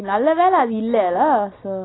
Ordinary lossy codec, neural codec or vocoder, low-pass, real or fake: AAC, 16 kbps; none; 7.2 kHz; real